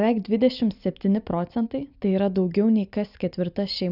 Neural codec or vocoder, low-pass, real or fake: none; 5.4 kHz; real